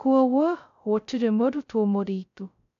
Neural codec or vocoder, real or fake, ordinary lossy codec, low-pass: codec, 16 kHz, 0.2 kbps, FocalCodec; fake; none; 7.2 kHz